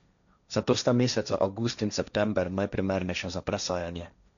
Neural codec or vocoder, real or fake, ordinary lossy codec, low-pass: codec, 16 kHz, 1.1 kbps, Voila-Tokenizer; fake; none; 7.2 kHz